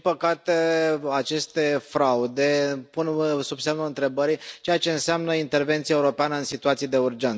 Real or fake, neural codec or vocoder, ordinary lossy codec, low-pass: real; none; none; none